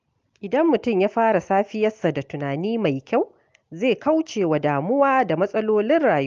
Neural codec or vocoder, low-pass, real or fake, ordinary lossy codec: none; 7.2 kHz; real; Opus, 32 kbps